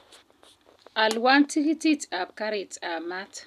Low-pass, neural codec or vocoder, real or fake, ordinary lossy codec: 14.4 kHz; none; real; none